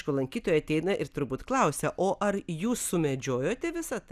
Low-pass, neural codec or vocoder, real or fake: 14.4 kHz; none; real